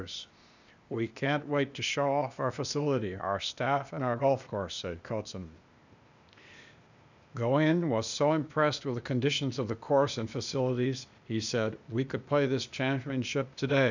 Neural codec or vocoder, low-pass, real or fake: codec, 16 kHz, 0.8 kbps, ZipCodec; 7.2 kHz; fake